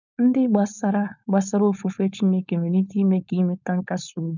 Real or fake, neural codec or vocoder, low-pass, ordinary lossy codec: fake; codec, 16 kHz, 4.8 kbps, FACodec; 7.2 kHz; none